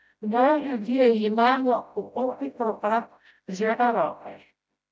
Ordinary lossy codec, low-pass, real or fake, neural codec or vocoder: none; none; fake; codec, 16 kHz, 0.5 kbps, FreqCodec, smaller model